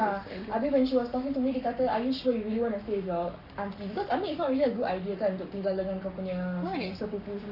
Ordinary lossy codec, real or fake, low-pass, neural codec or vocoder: none; fake; 5.4 kHz; codec, 44.1 kHz, 7.8 kbps, Pupu-Codec